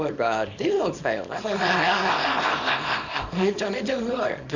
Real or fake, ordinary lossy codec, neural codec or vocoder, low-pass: fake; none; codec, 24 kHz, 0.9 kbps, WavTokenizer, small release; 7.2 kHz